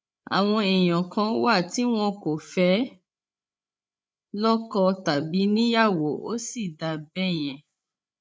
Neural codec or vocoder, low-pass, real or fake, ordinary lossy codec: codec, 16 kHz, 8 kbps, FreqCodec, larger model; none; fake; none